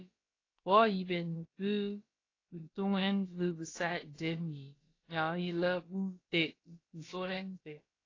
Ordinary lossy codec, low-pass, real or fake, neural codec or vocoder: AAC, 32 kbps; 7.2 kHz; fake; codec, 16 kHz, about 1 kbps, DyCAST, with the encoder's durations